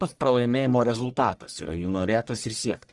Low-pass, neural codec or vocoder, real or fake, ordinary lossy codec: 10.8 kHz; codec, 44.1 kHz, 1.7 kbps, Pupu-Codec; fake; Opus, 24 kbps